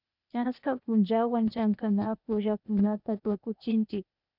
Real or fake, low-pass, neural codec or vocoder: fake; 5.4 kHz; codec, 16 kHz, 0.8 kbps, ZipCodec